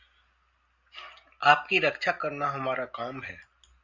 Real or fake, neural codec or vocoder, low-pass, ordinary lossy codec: real; none; 7.2 kHz; Opus, 64 kbps